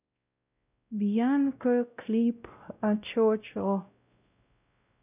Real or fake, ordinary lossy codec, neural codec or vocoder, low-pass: fake; none; codec, 16 kHz, 0.5 kbps, X-Codec, WavLM features, trained on Multilingual LibriSpeech; 3.6 kHz